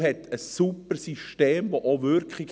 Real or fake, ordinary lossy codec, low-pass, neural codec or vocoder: real; none; none; none